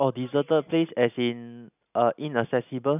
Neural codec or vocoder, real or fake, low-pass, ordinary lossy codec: none; real; 3.6 kHz; none